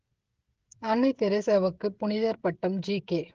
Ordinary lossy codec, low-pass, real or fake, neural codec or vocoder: Opus, 16 kbps; 7.2 kHz; fake; codec, 16 kHz, 16 kbps, FreqCodec, smaller model